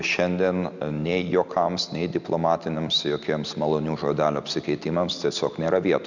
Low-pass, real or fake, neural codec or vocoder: 7.2 kHz; real; none